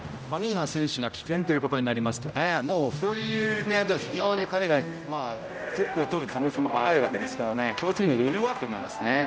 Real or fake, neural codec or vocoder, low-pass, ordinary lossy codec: fake; codec, 16 kHz, 0.5 kbps, X-Codec, HuBERT features, trained on general audio; none; none